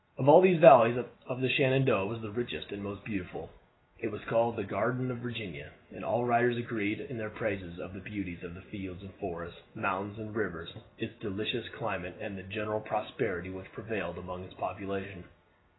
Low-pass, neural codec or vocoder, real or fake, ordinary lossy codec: 7.2 kHz; none; real; AAC, 16 kbps